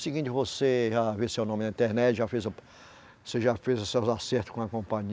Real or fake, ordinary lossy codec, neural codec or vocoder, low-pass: real; none; none; none